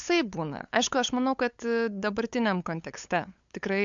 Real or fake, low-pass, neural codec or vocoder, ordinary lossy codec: fake; 7.2 kHz; codec, 16 kHz, 8 kbps, FunCodec, trained on LibriTTS, 25 frames a second; MP3, 64 kbps